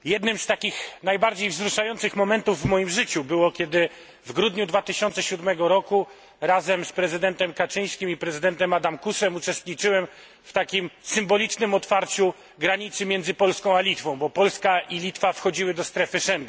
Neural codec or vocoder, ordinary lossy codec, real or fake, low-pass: none; none; real; none